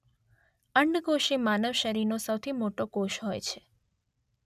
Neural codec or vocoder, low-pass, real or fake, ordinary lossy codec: none; 14.4 kHz; real; none